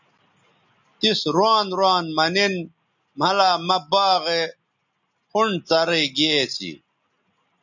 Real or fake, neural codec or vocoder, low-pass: real; none; 7.2 kHz